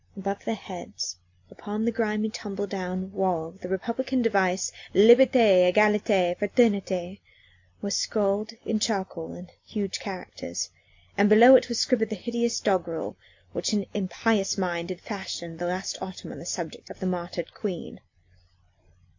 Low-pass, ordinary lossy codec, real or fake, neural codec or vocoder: 7.2 kHz; AAC, 48 kbps; real; none